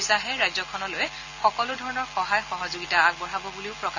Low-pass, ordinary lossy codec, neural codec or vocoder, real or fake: 7.2 kHz; AAC, 48 kbps; none; real